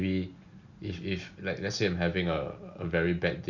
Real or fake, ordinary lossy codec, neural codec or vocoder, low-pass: real; none; none; 7.2 kHz